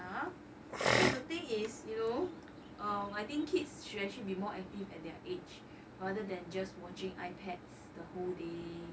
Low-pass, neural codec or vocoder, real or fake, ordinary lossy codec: none; none; real; none